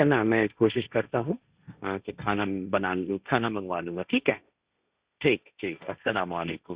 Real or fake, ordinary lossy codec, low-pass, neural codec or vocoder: fake; Opus, 64 kbps; 3.6 kHz; codec, 16 kHz, 1.1 kbps, Voila-Tokenizer